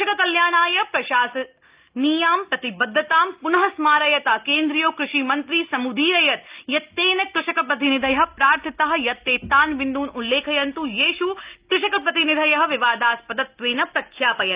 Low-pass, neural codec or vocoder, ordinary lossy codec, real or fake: 3.6 kHz; none; Opus, 32 kbps; real